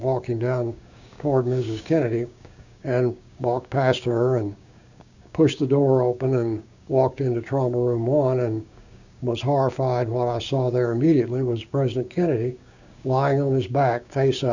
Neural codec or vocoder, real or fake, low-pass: codec, 16 kHz, 6 kbps, DAC; fake; 7.2 kHz